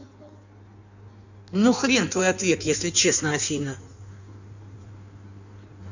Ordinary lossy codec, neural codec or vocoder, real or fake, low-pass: none; codec, 16 kHz in and 24 kHz out, 1.1 kbps, FireRedTTS-2 codec; fake; 7.2 kHz